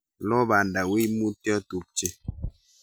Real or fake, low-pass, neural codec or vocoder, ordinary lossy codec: real; none; none; none